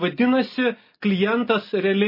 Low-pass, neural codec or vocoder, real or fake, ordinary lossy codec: 5.4 kHz; none; real; MP3, 24 kbps